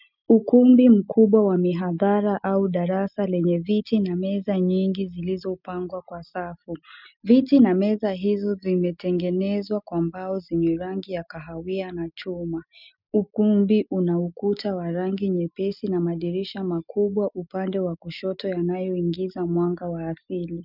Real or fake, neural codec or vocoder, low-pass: real; none; 5.4 kHz